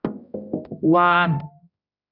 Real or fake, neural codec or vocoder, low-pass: fake; codec, 16 kHz, 0.5 kbps, X-Codec, HuBERT features, trained on general audio; 5.4 kHz